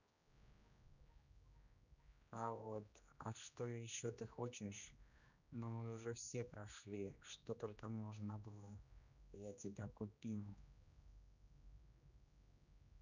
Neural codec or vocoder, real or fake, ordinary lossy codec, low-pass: codec, 16 kHz, 1 kbps, X-Codec, HuBERT features, trained on general audio; fake; none; 7.2 kHz